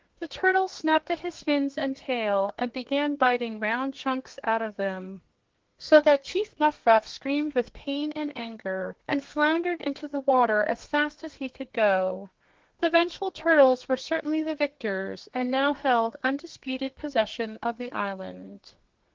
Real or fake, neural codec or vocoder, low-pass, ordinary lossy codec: fake; codec, 32 kHz, 1.9 kbps, SNAC; 7.2 kHz; Opus, 16 kbps